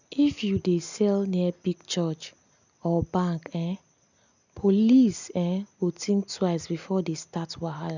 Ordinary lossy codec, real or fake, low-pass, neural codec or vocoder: none; real; 7.2 kHz; none